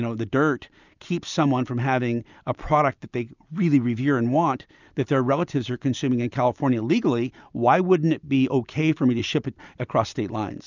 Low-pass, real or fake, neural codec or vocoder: 7.2 kHz; real; none